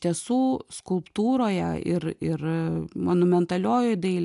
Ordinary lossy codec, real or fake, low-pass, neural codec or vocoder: AAC, 96 kbps; real; 10.8 kHz; none